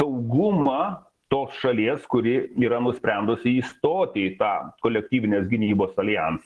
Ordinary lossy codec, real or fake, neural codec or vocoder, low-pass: Opus, 16 kbps; real; none; 10.8 kHz